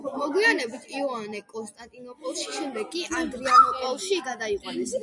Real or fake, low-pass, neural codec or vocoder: real; 10.8 kHz; none